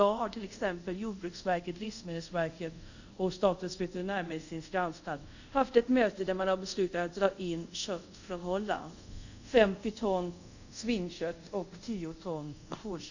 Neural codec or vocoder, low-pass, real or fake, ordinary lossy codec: codec, 24 kHz, 0.5 kbps, DualCodec; 7.2 kHz; fake; none